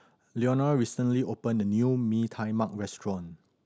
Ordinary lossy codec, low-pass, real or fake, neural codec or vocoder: none; none; real; none